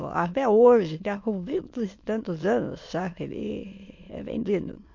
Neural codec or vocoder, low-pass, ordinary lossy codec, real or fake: autoencoder, 22.05 kHz, a latent of 192 numbers a frame, VITS, trained on many speakers; 7.2 kHz; MP3, 48 kbps; fake